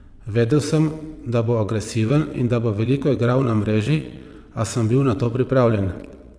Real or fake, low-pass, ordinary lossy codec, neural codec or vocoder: fake; none; none; vocoder, 22.05 kHz, 80 mel bands, WaveNeXt